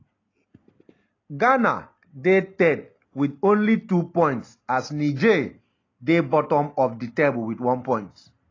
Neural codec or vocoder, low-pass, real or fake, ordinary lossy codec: none; 7.2 kHz; real; AAC, 32 kbps